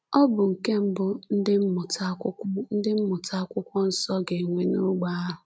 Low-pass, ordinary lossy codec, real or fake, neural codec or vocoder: none; none; real; none